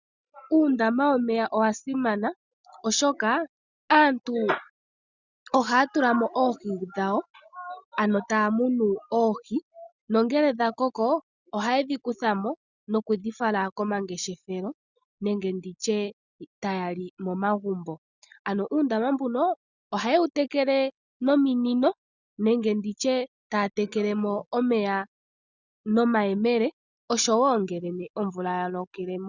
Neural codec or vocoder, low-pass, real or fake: none; 7.2 kHz; real